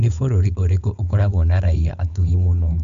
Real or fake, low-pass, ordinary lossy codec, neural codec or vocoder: fake; 7.2 kHz; Opus, 64 kbps; codec, 16 kHz, 4.8 kbps, FACodec